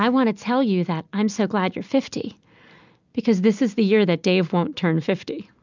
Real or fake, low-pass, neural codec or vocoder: real; 7.2 kHz; none